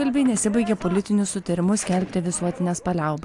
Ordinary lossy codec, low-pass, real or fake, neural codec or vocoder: AAC, 64 kbps; 10.8 kHz; real; none